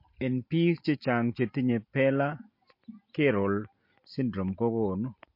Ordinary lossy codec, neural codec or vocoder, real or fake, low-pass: MP3, 32 kbps; none; real; 5.4 kHz